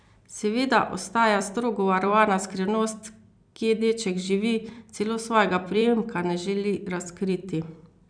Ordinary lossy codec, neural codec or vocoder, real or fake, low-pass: none; vocoder, 44.1 kHz, 128 mel bands every 256 samples, BigVGAN v2; fake; 9.9 kHz